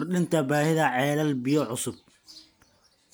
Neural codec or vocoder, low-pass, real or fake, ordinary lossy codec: none; none; real; none